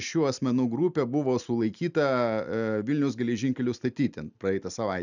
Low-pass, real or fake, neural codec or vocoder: 7.2 kHz; real; none